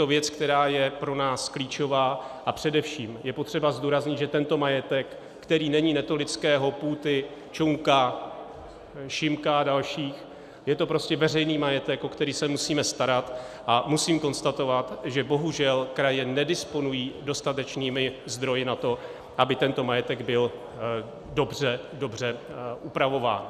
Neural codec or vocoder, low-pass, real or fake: none; 14.4 kHz; real